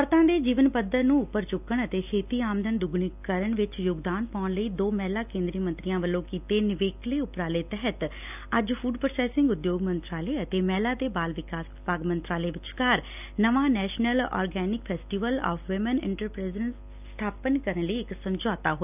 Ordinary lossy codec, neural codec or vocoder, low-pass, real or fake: none; none; 3.6 kHz; real